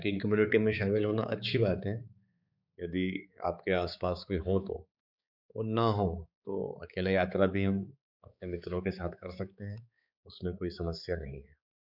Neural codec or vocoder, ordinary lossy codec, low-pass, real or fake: codec, 16 kHz, 4 kbps, X-Codec, HuBERT features, trained on balanced general audio; none; 5.4 kHz; fake